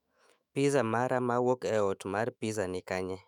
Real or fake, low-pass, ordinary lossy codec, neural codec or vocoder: fake; 19.8 kHz; none; autoencoder, 48 kHz, 128 numbers a frame, DAC-VAE, trained on Japanese speech